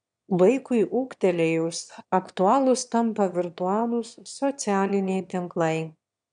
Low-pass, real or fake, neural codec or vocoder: 9.9 kHz; fake; autoencoder, 22.05 kHz, a latent of 192 numbers a frame, VITS, trained on one speaker